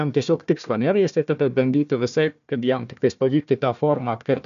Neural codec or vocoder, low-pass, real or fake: codec, 16 kHz, 1 kbps, FunCodec, trained on Chinese and English, 50 frames a second; 7.2 kHz; fake